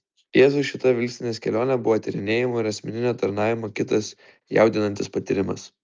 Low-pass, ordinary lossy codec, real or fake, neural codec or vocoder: 7.2 kHz; Opus, 24 kbps; real; none